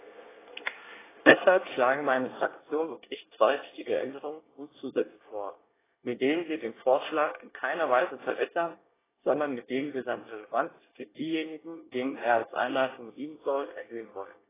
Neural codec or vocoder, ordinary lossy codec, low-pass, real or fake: codec, 24 kHz, 1 kbps, SNAC; AAC, 16 kbps; 3.6 kHz; fake